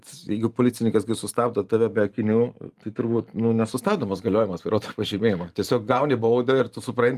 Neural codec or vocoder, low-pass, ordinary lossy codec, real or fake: vocoder, 44.1 kHz, 128 mel bands every 512 samples, BigVGAN v2; 14.4 kHz; Opus, 32 kbps; fake